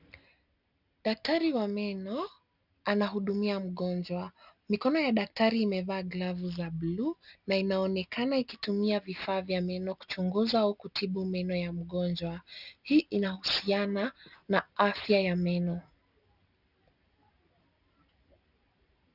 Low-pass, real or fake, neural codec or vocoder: 5.4 kHz; real; none